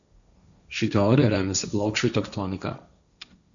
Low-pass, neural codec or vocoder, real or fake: 7.2 kHz; codec, 16 kHz, 1.1 kbps, Voila-Tokenizer; fake